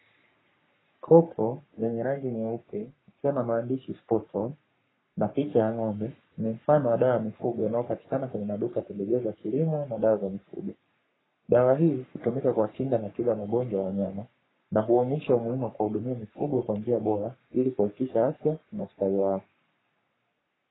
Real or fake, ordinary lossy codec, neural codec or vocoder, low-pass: fake; AAC, 16 kbps; codec, 44.1 kHz, 3.4 kbps, Pupu-Codec; 7.2 kHz